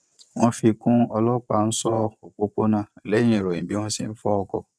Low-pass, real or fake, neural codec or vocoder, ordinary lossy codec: none; fake; vocoder, 22.05 kHz, 80 mel bands, WaveNeXt; none